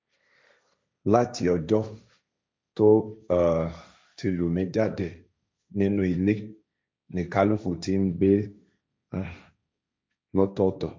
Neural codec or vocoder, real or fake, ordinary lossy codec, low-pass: codec, 16 kHz, 1.1 kbps, Voila-Tokenizer; fake; none; none